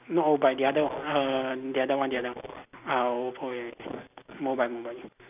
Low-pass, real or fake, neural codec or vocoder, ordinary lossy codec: 3.6 kHz; real; none; none